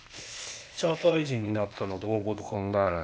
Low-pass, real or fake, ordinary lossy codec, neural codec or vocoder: none; fake; none; codec, 16 kHz, 0.8 kbps, ZipCodec